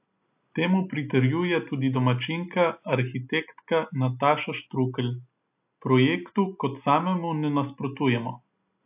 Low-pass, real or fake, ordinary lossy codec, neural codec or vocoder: 3.6 kHz; real; AAC, 32 kbps; none